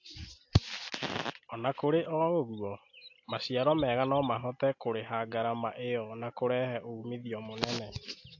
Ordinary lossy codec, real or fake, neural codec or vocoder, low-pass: AAC, 48 kbps; real; none; 7.2 kHz